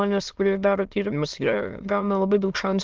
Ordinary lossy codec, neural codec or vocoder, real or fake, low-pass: Opus, 16 kbps; autoencoder, 22.05 kHz, a latent of 192 numbers a frame, VITS, trained on many speakers; fake; 7.2 kHz